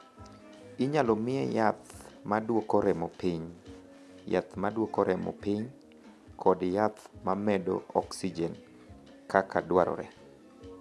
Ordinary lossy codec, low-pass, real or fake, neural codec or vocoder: none; none; real; none